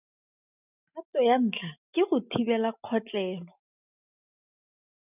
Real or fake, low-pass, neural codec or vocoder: real; 3.6 kHz; none